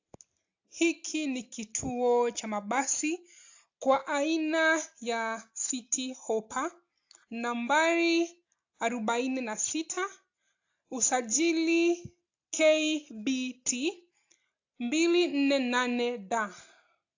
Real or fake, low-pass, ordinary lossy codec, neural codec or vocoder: real; 7.2 kHz; AAC, 48 kbps; none